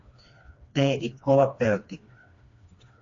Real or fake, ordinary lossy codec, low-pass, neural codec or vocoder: fake; AAC, 48 kbps; 7.2 kHz; codec, 16 kHz, 2 kbps, FreqCodec, smaller model